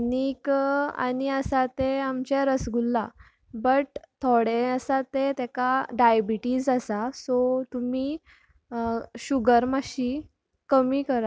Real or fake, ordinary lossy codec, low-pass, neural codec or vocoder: real; none; none; none